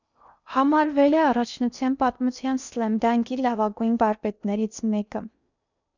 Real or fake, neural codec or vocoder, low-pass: fake; codec, 16 kHz in and 24 kHz out, 0.6 kbps, FocalCodec, streaming, 2048 codes; 7.2 kHz